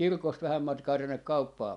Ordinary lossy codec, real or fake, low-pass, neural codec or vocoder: none; real; 10.8 kHz; none